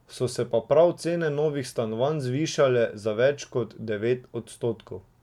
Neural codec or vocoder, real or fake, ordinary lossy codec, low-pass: none; real; MP3, 96 kbps; 19.8 kHz